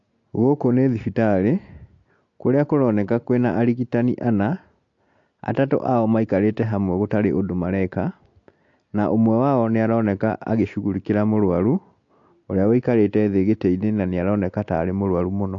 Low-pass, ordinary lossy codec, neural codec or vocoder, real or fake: 7.2 kHz; AAC, 48 kbps; none; real